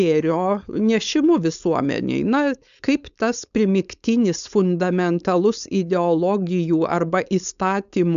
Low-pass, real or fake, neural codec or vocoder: 7.2 kHz; fake; codec, 16 kHz, 4.8 kbps, FACodec